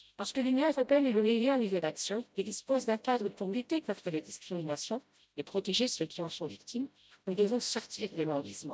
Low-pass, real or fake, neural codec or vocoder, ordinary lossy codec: none; fake; codec, 16 kHz, 0.5 kbps, FreqCodec, smaller model; none